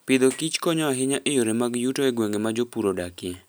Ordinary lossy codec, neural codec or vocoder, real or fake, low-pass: none; none; real; none